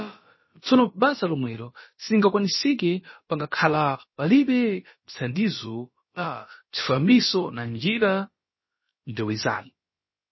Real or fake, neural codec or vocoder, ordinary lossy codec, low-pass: fake; codec, 16 kHz, about 1 kbps, DyCAST, with the encoder's durations; MP3, 24 kbps; 7.2 kHz